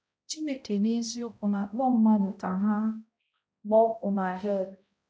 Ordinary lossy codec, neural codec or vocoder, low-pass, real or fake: none; codec, 16 kHz, 0.5 kbps, X-Codec, HuBERT features, trained on balanced general audio; none; fake